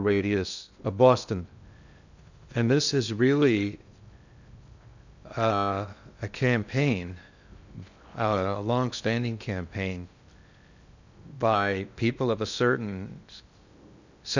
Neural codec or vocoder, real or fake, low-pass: codec, 16 kHz in and 24 kHz out, 0.6 kbps, FocalCodec, streaming, 2048 codes; fake; 7.2 kHz